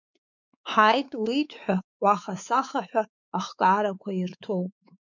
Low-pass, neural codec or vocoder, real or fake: 7.2 kHz; codec, 16 kHz, 4 kbps, X-Codec, WavLM features, trained on Multilingual LibriSpeech; fake